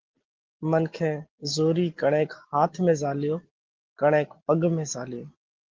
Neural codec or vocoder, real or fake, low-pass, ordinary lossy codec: none; real; 7.2 kHz; Opus, 16 kbps